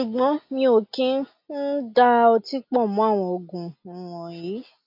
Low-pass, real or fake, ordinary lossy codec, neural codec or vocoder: 5.4 kHz; real; MP3, 32 kbps; none